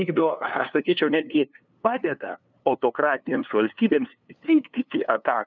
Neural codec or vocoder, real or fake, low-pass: codec, 16 kHz, 2 kbps, FunCodec, trained on LibriTTS, 25 frames a second; fake; 7.2 kHz